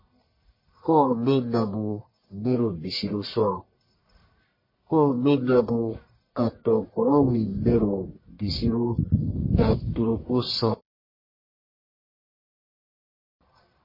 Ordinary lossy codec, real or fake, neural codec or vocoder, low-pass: MP3, 24 kbps; fake; codec, 44.1 kHz, 1.7 kbps, Pupu-Codec; 5.4 kHz